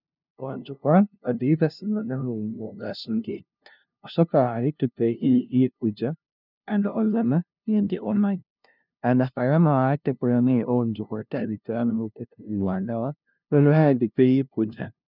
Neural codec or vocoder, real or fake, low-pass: codec, 16 kHz, 0.5 kbps, FunCodec, trained on LibriTTS, 25 frames a second; fake; 5.4 kHz